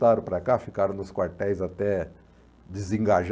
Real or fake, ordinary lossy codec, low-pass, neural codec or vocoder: real; none; none; none